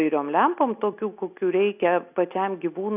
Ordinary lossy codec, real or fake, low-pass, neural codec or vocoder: AAC, 32 kbps; real; 3.6 kHz; none